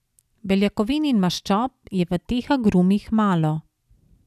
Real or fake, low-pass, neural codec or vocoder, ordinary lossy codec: real; 14.4 kHz; none; none